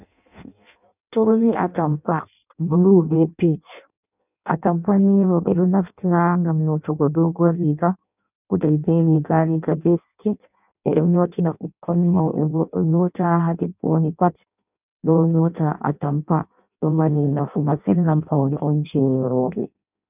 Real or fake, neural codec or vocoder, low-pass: fake; codec, 16 kHz in and 24 kHz out, 0.6 kbps, FireRedTTS-2 codec; 3.6 kHz